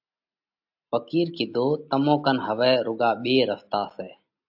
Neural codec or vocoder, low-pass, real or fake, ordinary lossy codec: none; 5.4 kHz; real; AAC, 48 kbps